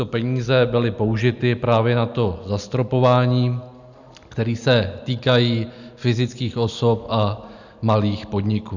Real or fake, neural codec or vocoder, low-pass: real; none; 7.2 kHz